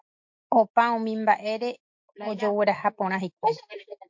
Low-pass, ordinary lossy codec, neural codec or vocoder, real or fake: 7.2 kHz; MP3, 48 kbps; none; real